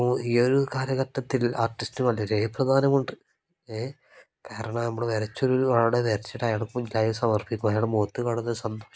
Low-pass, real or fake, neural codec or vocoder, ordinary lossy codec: none; real; none; none